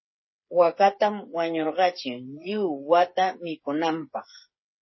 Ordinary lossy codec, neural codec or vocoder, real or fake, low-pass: MP3, 24 kbps; codec, 16 kHz, 8 kbps, FreqCodec, smaller model; fake; 7.2 kHz